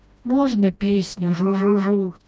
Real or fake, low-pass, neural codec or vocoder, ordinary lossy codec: fake; none; codec, 16 kHz, 1 kbps, FreqCodec, smaller model; none